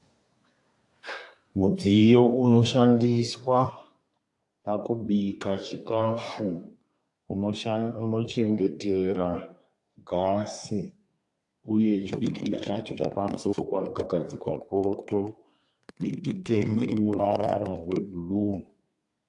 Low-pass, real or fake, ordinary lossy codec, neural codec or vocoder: 10.8 kHz; fake; AAC, 64 kbps; codec, 24 kHz, 1 kbps, SNAC